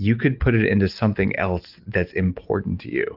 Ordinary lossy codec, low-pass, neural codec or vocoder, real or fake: Opus, 32 kbps; 5.4 kHz; none; real